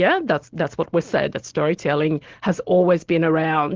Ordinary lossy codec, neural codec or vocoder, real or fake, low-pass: Opus, 16 kbps; none; real; 7.2 kHz